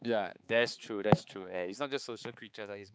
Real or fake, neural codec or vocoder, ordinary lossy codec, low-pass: fake; codec, 16 kHz, 4 kbps, X-Codec, HuBERT features, trained on balanced general audio; none; none